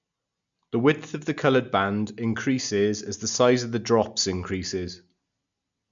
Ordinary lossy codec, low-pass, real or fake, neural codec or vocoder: MP3, 96 kbps; 7.2 kHz; real; none